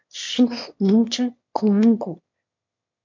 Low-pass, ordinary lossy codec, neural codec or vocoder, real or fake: 7.2 kHz; MP3, 48 kbps; autoencoder, 22.05 kHz, a latent of 192 numbers a frame, VITS, trained on one speaker; fake